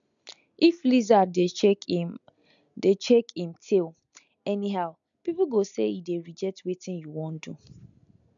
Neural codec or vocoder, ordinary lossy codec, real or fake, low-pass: none; none; real; 7.2 kHz